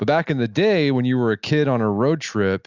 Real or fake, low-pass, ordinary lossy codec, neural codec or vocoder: real; 7.2 kHz; Opus, 64 kbps; none